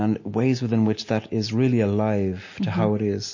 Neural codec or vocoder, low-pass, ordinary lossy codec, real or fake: none; 7.2 kHz; MP3, 32 kbps; real